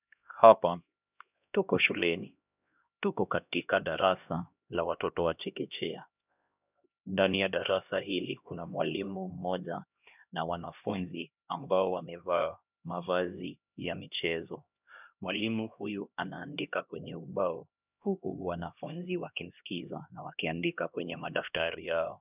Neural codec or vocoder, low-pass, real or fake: codec, 16 kHz, 1 kbps, X-Codec, HuBERT features, trained on LibriSpeech; 3.6 kHz; fake